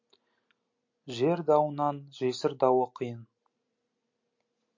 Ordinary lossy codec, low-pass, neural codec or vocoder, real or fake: MP3, 48 kbps; 7.2 kHz; none; real